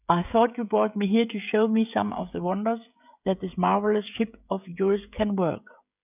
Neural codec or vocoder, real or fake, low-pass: codec, 16 kHz, 16 kbps, FreqCodec, smaller model; fake; 3.6 kHz